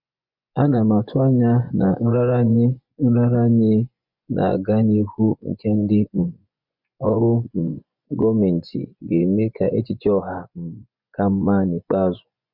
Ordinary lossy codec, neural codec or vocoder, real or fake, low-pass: none; vocoder, 24 kHz, 100 mel bands, Vocos; fake; 5.4 kHz